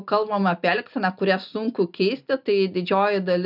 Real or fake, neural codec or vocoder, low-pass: real; none; 5.4 kHz